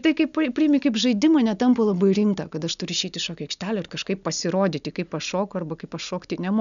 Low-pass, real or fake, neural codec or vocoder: 7.2 kHz; real; none